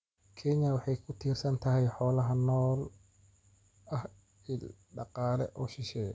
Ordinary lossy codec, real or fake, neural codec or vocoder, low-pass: none; real; none; none